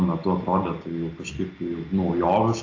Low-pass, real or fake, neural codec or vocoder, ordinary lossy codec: 7.2 kHz; real; none; AAC, 48 kbps